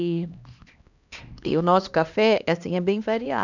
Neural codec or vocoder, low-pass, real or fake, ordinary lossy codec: codec, 16 kHz, 2 kbps, X-Codec, HuBERT features, trained on LibriSpeech; 7.2 kHz; fake; none